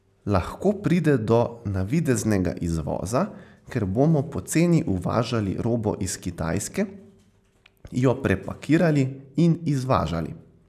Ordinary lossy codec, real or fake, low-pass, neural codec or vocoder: none; real; 14.4 kHz; none